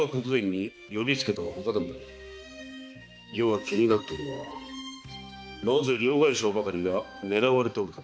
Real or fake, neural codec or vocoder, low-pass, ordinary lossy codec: fake; codec, 16 kHz, 2 kbps, X-Codec, HuBERT features, trained on balanced general audio; none; none